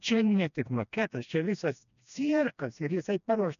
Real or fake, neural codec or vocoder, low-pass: fake; codec, 16 kHz, 1 kbps, FreqCodec, smaller model; 7.2 kHz